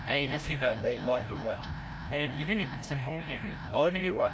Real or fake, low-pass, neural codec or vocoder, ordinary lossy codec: fake; none; codec, 16 kHz, 0.5 kbps, FreqCodec, larger model; none